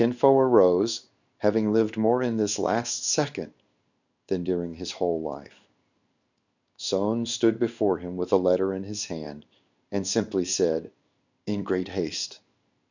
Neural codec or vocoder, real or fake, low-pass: codec, 16 kHz in and 24 kHz out, 1 kbps, XY-Tokenizer; fake; 7.2 kHz